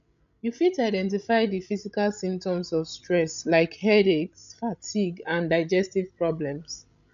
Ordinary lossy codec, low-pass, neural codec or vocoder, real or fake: none; 7.2 kHz; codec, 16 kHz, 16 kbps, FreqCodec, larger model; fake